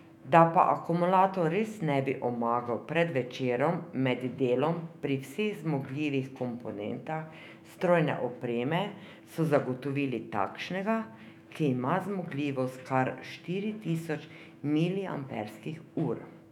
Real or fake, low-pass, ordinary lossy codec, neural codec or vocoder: fake; 19.8 kHz; none; autoencoder, 48 kHz, 128 numbers a frame, DAC-VAE, trained on Japanese speech